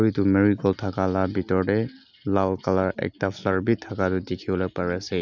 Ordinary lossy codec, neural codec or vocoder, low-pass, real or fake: none; none; 7.2 kHz; real